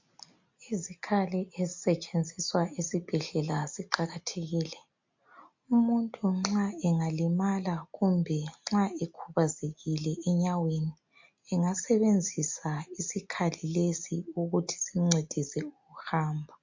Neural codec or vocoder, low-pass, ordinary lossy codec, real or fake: none; 7.2 kHz; MP3, 48 kbps; real